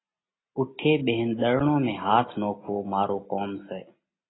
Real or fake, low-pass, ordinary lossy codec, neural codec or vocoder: real; 7.2 kHz; AAC, 16 kbps; none